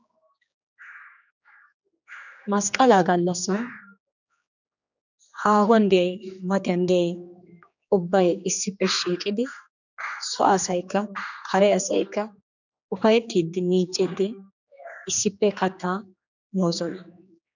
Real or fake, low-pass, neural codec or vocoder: fake; 7.2 kHz; codec, 16 kHz, 2 kbps, X-Codec, HuBERT features, trained on general audio